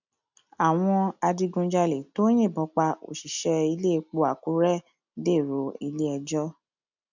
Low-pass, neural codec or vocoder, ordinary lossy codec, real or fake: 7.2 kHz; none; none; real